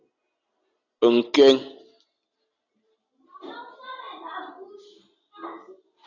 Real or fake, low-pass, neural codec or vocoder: real; 7.2 kHz; none